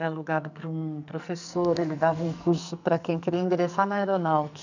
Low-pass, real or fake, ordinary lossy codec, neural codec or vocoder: 7.2 kHz; fake; none; codec, 44.1 kHz, 2.6 kbps, SNAC